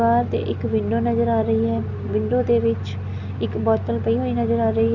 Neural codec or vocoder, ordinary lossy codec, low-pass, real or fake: none; none; 7.2 kHz; real